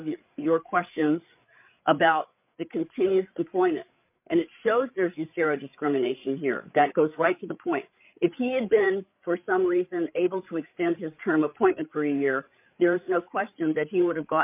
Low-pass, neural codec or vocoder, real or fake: 3.6 kHz; codec, 16 kHz, 8 kbps, FreqCodec, larger model; fake